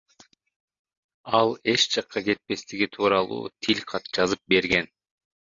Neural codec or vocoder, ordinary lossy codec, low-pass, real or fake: none; AAC, 64 kbps; 7.2 kHz; real